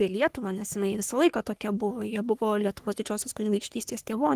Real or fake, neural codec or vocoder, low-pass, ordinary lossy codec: fake; codec, 44.1 kHz, 3.4 kbps, Pupu-Codec; 14.4 kHz; Opus, 24 kbps